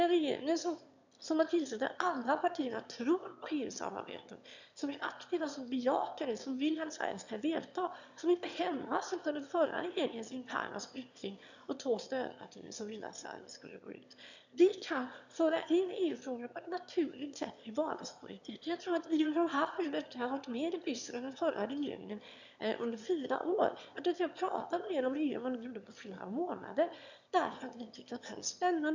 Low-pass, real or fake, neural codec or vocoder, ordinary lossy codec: 7.2 kHz; fake; autoencoder, 22.05 kHz, a latent of 192 numbers a frame, VITS, trained on one speaker; none